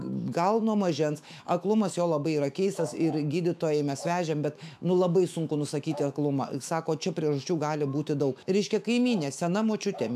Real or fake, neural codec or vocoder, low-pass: fake; autoencoder, 48 kHz, 128 numbers a frame, DAC-VAE, trained on Japanese speech; 14.4 kHz